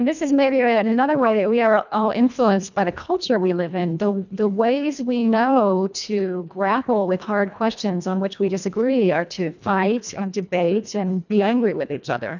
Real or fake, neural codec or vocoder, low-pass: fake; codec, 24 kHz, 1.5 kbps, HILCodec; 7.2 kHz